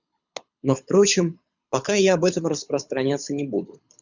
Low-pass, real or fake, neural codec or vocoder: 7.2 kHz; fake; codec, 24 kHz, 6 kbps, HILCodec